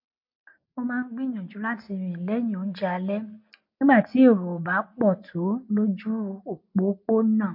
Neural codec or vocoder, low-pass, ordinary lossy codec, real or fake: none; 5.4 kHz; MP3, 32 kbps; real